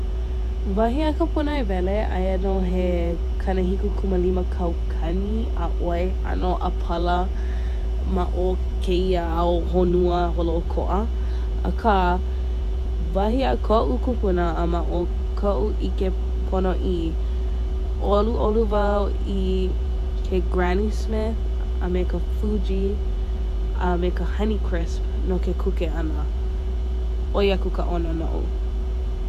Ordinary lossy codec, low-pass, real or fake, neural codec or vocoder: AAC, 96 kbps; 14.4 kHz; fake; vocoder, 48 kHz, 128 mel bands, Vocos